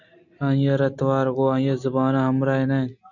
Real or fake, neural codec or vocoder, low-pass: real; none; 7.2 kHz